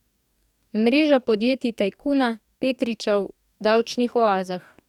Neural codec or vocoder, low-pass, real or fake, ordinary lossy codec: codec, 44.1 kHz, 2.6 kbps, DAC; 19.8 kHz; fake; none